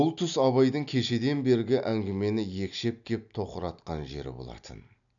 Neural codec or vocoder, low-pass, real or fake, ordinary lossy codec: none; 7.2 kHz; real; none